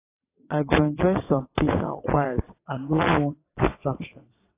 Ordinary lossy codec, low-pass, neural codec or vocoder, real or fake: AAC, 24 kbps; 3.6 kHz; vocoder, 22.05 kHz, 80 mel bands, WaveNeXt; fake